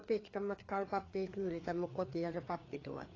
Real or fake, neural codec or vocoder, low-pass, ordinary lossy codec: fake; codec, 16 kHz, 4 kbps, FreqCodec, larger model; 7.2 kHz; AAC, 32 kbps